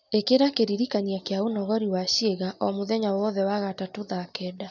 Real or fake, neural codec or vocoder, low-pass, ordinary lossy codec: real; none; 7.2 kHz; none